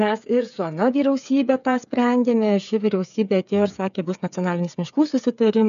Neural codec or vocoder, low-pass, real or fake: codec, 16 kHz, 8 kbps, FreqCodec, smaller model; 7.2 kHz; fake